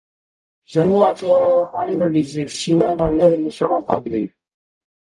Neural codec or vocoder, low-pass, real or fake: codec, 44.1 kHz, 0.9 kbps, DAC; 10.8 kHz; fake